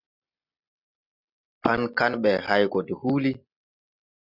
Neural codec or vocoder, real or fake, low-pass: none; real; 5.4 kHz